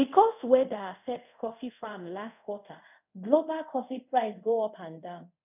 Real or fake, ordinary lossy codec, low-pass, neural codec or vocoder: fake; none; 3.6 kHz; codec, 24 kHz, 0.5 kbps, DualCodec